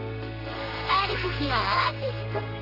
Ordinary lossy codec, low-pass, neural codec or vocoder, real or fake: none; 5.4 kHz; codec, 32 kHz, 1.9 kbps, SNAC; fake